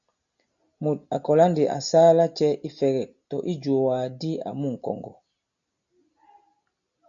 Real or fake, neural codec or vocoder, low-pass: real; none; 7.2 kHz